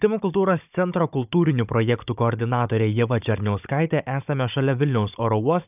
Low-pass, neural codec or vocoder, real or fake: 3.6 kHz; codec, 16 kHz, 16 kbps, FunCodec, trained on Chinese and English, 50 frames a second; fake